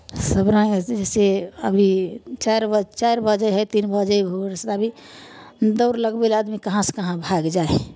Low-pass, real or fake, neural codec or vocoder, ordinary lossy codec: none; real; none; none